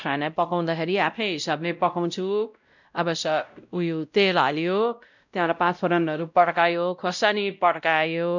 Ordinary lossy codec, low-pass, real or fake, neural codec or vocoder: none; 7.2 kHz; fake; codec, 16 kHz, 0.5 kbps, X-Codec, WavLM features, trained on Multilingual LibriSpeech